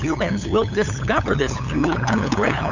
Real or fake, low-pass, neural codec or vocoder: fake; 7.2 kHz; codec, 16 kHz, 8 kbps, FunCodec, trained on LibriTTS, 25 frames a second